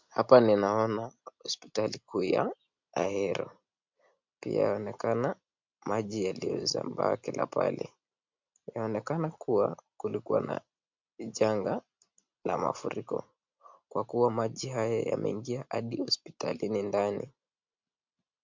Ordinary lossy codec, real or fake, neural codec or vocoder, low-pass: MP3, 64 kbps; real; none; 7.2 kHz